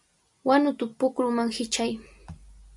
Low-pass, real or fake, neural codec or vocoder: 10.8 kHz; real; none